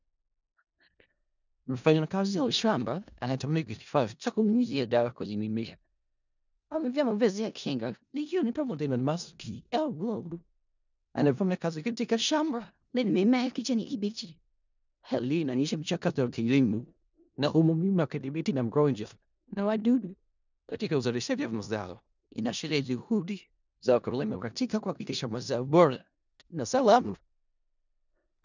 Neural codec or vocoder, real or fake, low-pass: codec, 16 kHz in and 24 kHz out, 0.4 kbps, LongCat-Audio-Codec, four codebook decoder; fake; 7.2 kHz